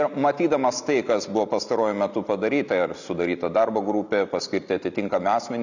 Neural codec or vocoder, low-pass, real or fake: none; 7.2 kHz; real